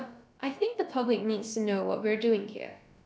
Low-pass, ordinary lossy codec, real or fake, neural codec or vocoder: none; none; fake; codec, 16 kHz, about 1 kbps, DyCAST, with the encoder's durations